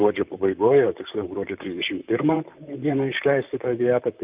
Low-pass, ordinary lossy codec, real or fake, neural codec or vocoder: 3.6 kHz; Opus, 24 kbps; fake; vocoder, 44.1 kHz, 128 mel bands, Pupu-Vocoder